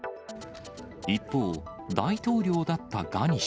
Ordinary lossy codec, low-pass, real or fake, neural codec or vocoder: none; none; real; none